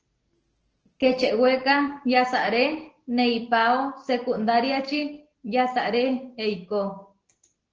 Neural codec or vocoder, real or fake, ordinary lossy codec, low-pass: none; real; Opus, 16 kbps; 7.2 kHz